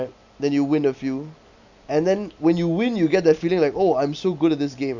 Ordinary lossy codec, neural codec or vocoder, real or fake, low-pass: none; none; real; 7.2 kHz